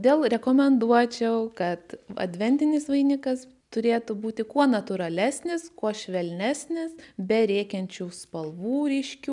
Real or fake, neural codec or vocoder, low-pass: real; none; 10.8 kHz